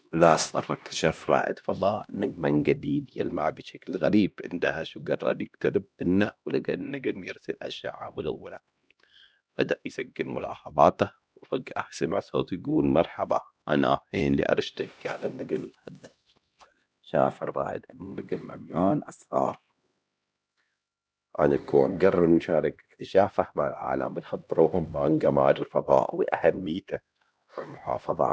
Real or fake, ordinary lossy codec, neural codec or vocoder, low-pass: fake; none; codec, 16 kHz, 1 kbps, X-Codec, HuBERT features, trained on LibriSpeech; none